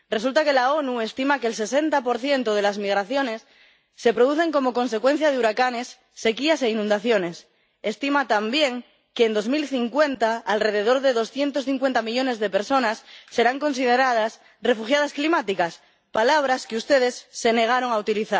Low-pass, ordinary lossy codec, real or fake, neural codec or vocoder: none; none; real; none